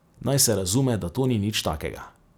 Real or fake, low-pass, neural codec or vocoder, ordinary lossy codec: real; none; none; none